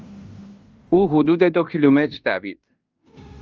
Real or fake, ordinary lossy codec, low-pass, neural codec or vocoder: fake; Opus, 24 kbps; 7.2 kHz; codec, 16 kHz in and 24 kHz out, 0.9 kbps, LongCat-Audio-Codec, fine tuned four codebook decoder